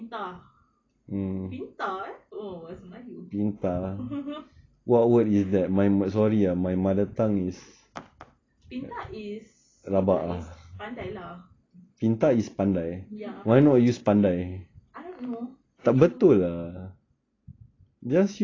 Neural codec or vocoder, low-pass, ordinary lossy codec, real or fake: none; 7.2 kHz; AAC, 32 kbps; real